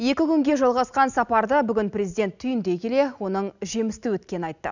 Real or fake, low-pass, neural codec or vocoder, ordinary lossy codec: real; 7.2 kHz; none; none